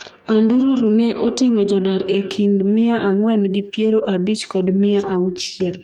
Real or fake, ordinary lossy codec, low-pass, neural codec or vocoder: fake; none; 19.8 kHz; codec, 44.1 kHz, 2.6 kbps, DAC